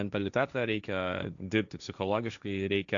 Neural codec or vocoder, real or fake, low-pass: codec, 16 kHz, 1.1 kbps, Voila-Tokenizer; fake; 7.2 kHz